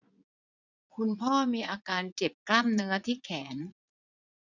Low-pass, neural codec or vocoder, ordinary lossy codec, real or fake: 7.2 kHz; vocoder, 44.1 kHz, 80 mel bands, Vocos; none; fake